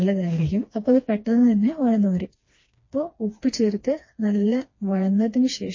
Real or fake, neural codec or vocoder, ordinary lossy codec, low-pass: fake; codec, 16 kHz, 2 kbps, FreqCodec, smaller model; MP3, 32 kbps; 7.2 kHz